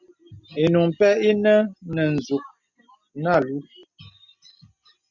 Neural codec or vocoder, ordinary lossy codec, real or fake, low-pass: none; Opus, 64 kbps; real; 7.2 kHz